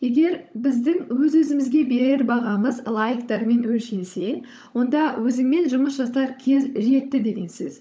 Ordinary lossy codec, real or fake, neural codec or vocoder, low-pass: none; fake; codec, 16 kHz, 16 kbps, FunCodec, trained on LibriTTS, 50 frames a second; none